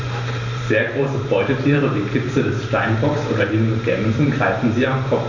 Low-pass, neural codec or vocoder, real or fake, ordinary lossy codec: 7.2 kHz; vocoder, 44.1 kHz, 128 mel bands every 512 samples, BigVGAN v2; fake; none